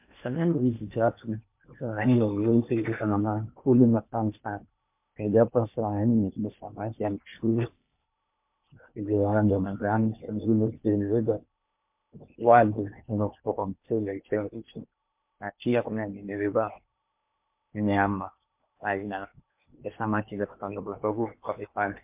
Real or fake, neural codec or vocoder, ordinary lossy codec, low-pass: fake; codec, 16 kHz in and 24 kHz out, 0.8 kbps, FocalCodec, streaming, 65536 codes; MP3, 32 kbps; 3.6 kHz